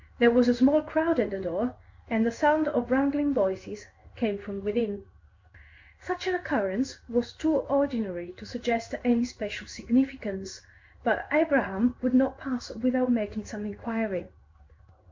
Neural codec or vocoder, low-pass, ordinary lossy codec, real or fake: codec, 16 kHz in and 24 kHz out, 1 kbps, XY-Tokenizer; 7.2 kHz; MP3, 64 kbps; fake